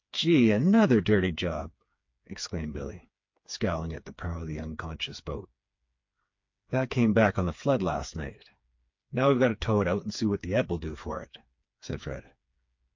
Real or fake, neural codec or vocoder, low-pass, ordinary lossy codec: fake; codec, 16 kHz, 4 kbps, FreqCodec, smaller model; 7.2 kHz; MP3, 48 kbps